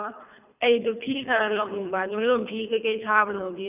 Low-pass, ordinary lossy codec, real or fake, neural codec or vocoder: 3.6 kHz; none; fake; codec, 24 kHz, 3 kbps, HILCodec